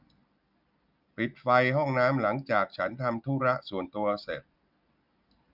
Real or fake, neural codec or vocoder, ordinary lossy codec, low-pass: real; none; none; 5.4 kHz